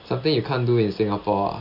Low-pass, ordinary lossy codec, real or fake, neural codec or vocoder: 5.4 kHz; none; real; none